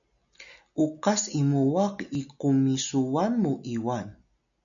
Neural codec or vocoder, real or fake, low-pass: none; real; 7.2 kHz